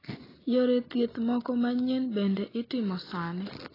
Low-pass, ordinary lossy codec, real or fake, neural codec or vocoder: 5.4 kHz; AAC, 24 kbps; real; none